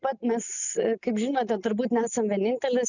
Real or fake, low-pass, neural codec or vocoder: real; 7.2 kHz; none